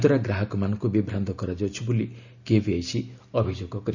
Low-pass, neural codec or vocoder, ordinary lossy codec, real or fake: 7.2 kHz; none; none; real